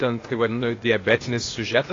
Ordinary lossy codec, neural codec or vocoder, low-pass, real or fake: AAC, 32 kbps; codec, 16 kHz, 0.8 kbps, ZipCodec; 7.2 kHz; fake